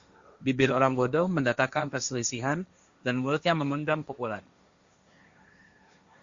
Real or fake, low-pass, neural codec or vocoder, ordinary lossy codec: fake; 7.2 kHz; codec, 16 kHz, 1.1 kbps, Voila-Tokenizer; Opus, 64 kbps